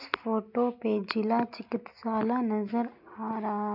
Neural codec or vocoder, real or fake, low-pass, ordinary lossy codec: none; real; 5.4 kHz; none